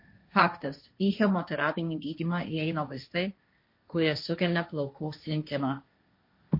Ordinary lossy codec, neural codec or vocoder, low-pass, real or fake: MP3, 32 kbps; codec, 16 kHz, 1.1 kbps, Voila-Tokenizer; 5.4 kHz; fake